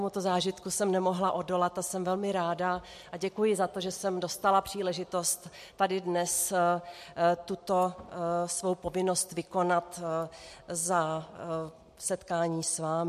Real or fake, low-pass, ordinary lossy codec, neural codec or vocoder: real; 14.4 kHz; MP3, 64 kbps; none